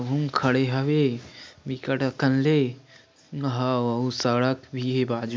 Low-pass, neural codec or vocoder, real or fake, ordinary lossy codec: none; none; real; none